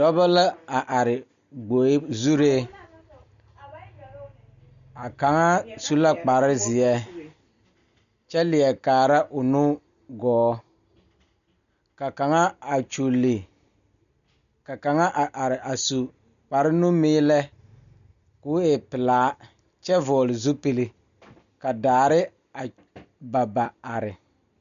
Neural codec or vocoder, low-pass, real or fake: none; 7.2 kHz; real